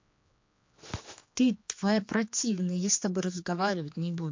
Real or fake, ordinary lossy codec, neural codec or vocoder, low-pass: fake; MP3, 48 kbps; codec, 16 kHz, 2 kbps, X-Codec, HuBERT features, trained on general audio; 7.2 kHz